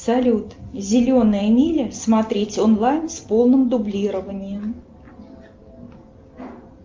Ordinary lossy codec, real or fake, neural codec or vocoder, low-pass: Opus, 24 kbps; real; none; 7.2 kHz